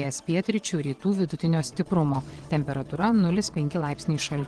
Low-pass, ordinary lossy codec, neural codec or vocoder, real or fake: 9.9 kHz; Opus, 16 kbps; vocoder, 22.05 kHz, 80 mel bands, WaveNeXt; fake